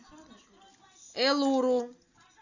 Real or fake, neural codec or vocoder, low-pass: real; none; 7.2 kHz